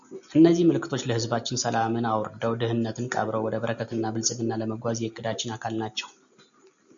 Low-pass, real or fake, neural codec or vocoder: 7.2 kHz; real; none